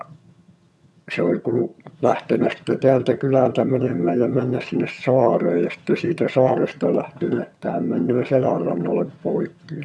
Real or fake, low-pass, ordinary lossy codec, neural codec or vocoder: fake; none; none; vocoder, 22.05 kHz, 80 mel bands, HiFi-GAN